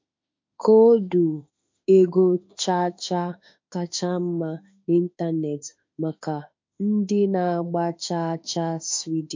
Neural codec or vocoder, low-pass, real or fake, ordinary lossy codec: autoencoder, 48 kHz, 32 numbers a frame, DAC-VAE, trained on Japanese speech; 7.2 kHz; fake; MP3, 48 kbps